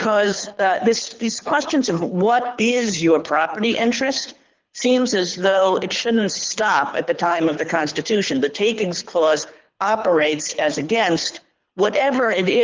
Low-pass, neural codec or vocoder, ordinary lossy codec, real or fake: 7.2 kHz; codec, 24 kHz, 3 kbps, HILCodec; Opus, 32 kbps; fake